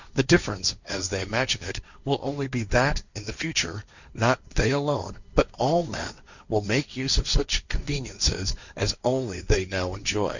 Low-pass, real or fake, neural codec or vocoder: 7.2 kHz; fake; codec, 16 kHz, 1.1 kbps, Voila-Tokenizer